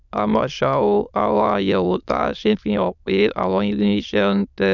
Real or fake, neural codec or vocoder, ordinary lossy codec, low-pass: fake; autoencoder, 22.05 kHz, a latent of 192 numbers a frame, VITS, trained on many speakers; none; 7.2 kHz